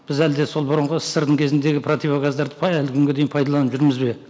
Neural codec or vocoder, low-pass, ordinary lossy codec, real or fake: none; none; none; real